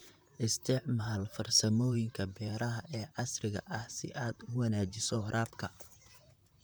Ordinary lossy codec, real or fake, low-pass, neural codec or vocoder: none; fake; none; vocoder, 44.1 kHz, 128 mel bands, Pupu-Vocoder